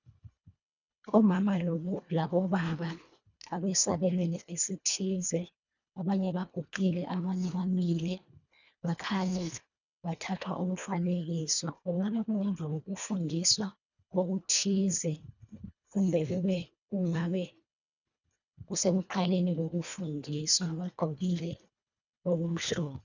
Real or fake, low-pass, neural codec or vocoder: fake; 7.2 kHz; codec, 24 kHz, 1.5 kbps, HILCodec